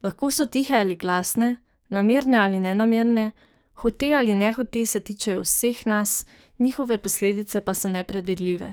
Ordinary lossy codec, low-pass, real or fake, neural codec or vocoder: none; none; fake; codec, 44.1 kHz, 2.6 kbps, SNAC